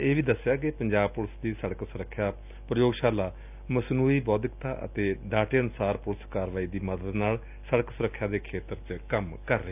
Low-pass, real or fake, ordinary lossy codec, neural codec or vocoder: 3.6 kHz; real; none; none